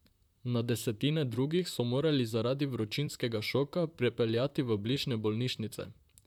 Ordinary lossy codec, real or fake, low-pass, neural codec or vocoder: none; fake; 19.8 kHz; vocoder, 44.1 kHz, 128 mel bands, Pupu-Vocoder